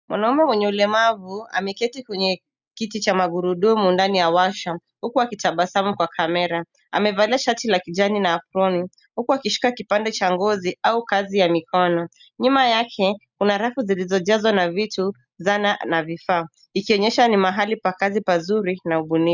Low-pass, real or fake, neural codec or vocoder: 7.2 kHz; real; none